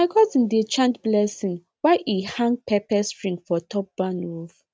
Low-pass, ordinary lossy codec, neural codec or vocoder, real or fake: none; none; none; real